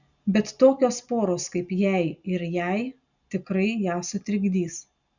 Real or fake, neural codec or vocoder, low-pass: real; none; 7.2 kHz